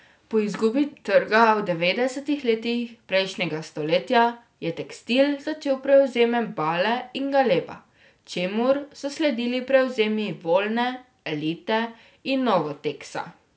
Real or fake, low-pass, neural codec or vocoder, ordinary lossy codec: real; none; none; none